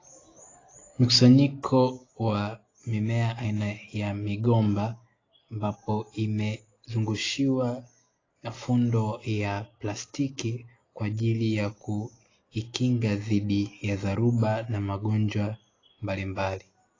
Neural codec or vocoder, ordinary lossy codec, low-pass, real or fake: none; AAC, 32 kbps; 7.2 kHz; real